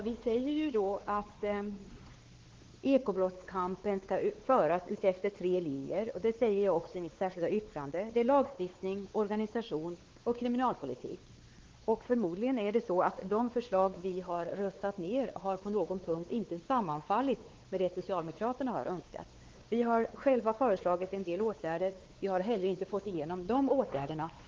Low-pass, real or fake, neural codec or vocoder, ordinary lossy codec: 7.2 kHz; fake; codec, 16 kHz, 4 kbps, X-Codec, WavLM features, trained on Multilingual LibriSpeech; Opus, 16 kbps